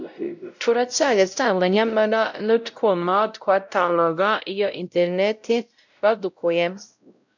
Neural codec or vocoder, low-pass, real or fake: codec, 16 kHz, 0.5 kbps, X-Codec, WavLM features, trained on Multilingual LibriSpeech; 7.2 kHz; fake